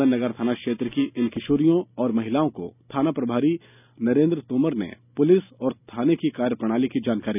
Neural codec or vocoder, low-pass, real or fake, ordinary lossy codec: none; 3.6 kHz; real; none